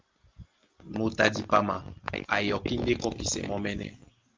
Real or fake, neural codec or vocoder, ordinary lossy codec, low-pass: real; none; Opus, 24 kbps; 7.2 kHz